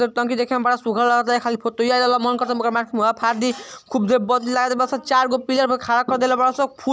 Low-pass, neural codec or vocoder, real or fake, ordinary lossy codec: none; none; real; none